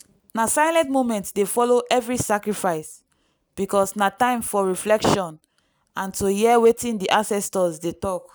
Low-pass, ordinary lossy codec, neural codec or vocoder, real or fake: none; none; none; real